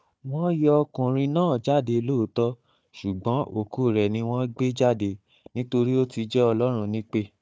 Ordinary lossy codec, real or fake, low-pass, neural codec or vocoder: none; fake; none; codec, 16 kHz, 4 kbps, FunCodec, trained on Chinese and English, 50 frames a second